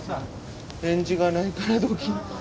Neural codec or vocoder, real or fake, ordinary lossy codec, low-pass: none; real; none; none